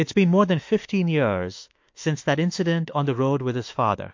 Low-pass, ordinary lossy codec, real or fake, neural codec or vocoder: 7.2 kHz; MP3, 48 kbps; fake; autoencoder, 48 kHz, 32 numbers a frame, DAC-VAE, trained on Japanese speech